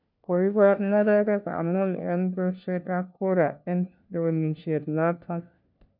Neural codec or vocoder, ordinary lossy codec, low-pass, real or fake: codec, 16 kHz, 1 kbps, FunCodec, trained on LibriTTS, 50 frames a second; none; 5.4 kHz; fake